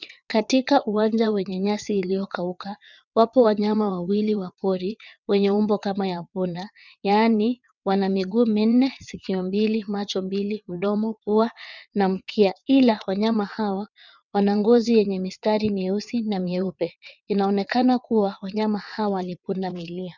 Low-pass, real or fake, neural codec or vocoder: 7.2 kHz; fake; vocoder, 22.05 kHz, 80 mel bands, WaveNeXt